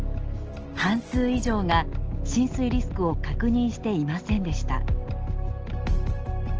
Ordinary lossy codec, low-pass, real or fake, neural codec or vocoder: Opus, 16 kbps; 7.2 kHz; real; none